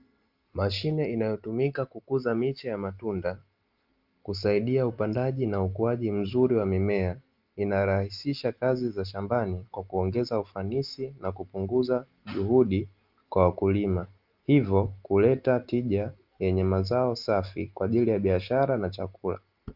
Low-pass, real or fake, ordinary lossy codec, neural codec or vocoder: 5.4 kHz; real; Opus, 32 kbps; none